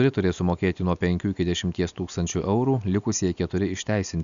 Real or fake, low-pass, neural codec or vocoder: real; 7.2 kHz; none